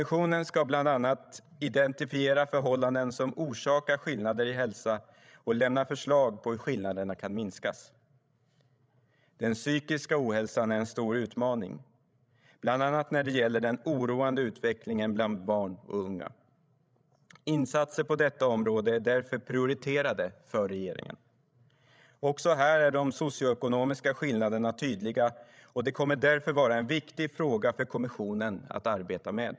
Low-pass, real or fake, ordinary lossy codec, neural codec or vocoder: none; fake; none; codec, 16 kHz, 16 kbps, FreqCodec, larger model